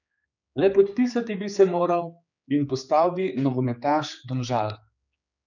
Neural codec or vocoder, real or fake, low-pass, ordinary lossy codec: codec, 16 kHz, 4 kbps, X-Codec, HuBERT features, trained on general audio; fake; 7.2 kHz; none